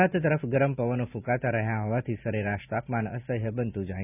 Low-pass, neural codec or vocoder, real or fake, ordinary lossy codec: 3.6 kHz; none; real; none